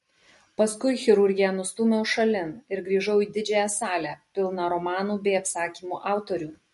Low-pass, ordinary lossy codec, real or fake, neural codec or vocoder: 14.4 kHz; MP3, 48 kbps; real; none